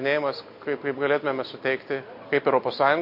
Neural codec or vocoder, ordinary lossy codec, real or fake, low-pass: none; MP3, 32 kbps; real; 5.4 kHz